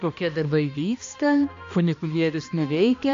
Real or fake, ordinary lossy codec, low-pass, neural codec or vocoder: fake; MP3, 48 kbps; 7.2 kHz; codec, 16 kHz, 1 kbps, X-Codec, HuBERT features, trained on balanced general audio